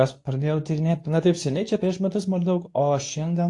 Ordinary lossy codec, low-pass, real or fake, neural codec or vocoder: AAC, 48 kbps; 10.8 kHz; fake; codec, 24 kHz, 0.9 kbps, WavTokenizer, medium speech release version 2